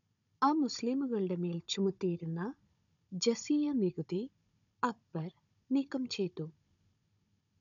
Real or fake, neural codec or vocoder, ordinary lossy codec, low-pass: fake; codec, 16 kHz, 16 kbps, FunCodec, trained on Chinese and English, 50 frames a second; none; 7.2 kHz